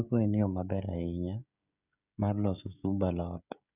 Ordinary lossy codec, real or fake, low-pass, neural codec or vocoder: none; fake; 3.6 kHz; codec, 16 kHz, 16 kbps, FreqCodec, smaller model